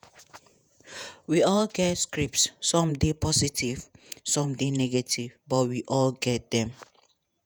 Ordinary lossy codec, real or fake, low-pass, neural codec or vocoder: none; fake; none; vocoder, 48 kHz, 128 mel bands, Vocos